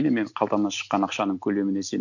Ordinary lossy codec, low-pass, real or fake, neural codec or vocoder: none; 7.2 kHz; real; none